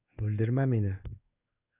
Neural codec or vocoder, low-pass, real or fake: codec, 16 kHz in and 24 kHz out, 1 kbps, XY-Tokenizer; 3.6 kHz; fake